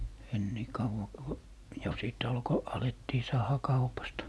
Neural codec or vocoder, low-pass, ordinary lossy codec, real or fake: none; none; none; real